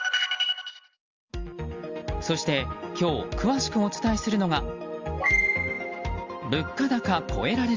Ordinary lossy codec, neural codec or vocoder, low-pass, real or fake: Opus, 32 kbps; none; 7.2 kHz; real